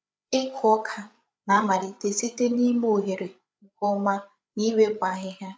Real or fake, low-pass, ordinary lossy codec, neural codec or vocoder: fake; none; none; codec, 16 kHz, 8 kbps, FreqCodec, larger model